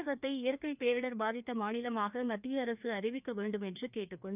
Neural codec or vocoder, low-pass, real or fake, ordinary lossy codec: codec, 16 kHz, 2 kbps, FreqCodec, larger model; 3.6 kHz; fake; none